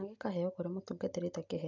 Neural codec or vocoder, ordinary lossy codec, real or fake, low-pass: none; none; real; 7.2 kHz